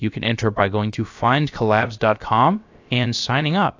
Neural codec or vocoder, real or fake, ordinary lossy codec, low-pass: codec, 16 kHz, about 1 kbps, DyCAST, with the encoder's durations; fake; AAC, 48 kbps; 7.2 kHz